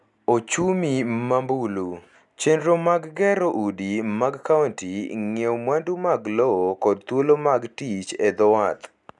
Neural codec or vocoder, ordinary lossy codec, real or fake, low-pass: none; none; real; 10.8 kHz